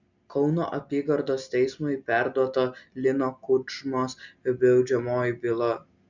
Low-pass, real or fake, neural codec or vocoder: 7.2 kHz; real; none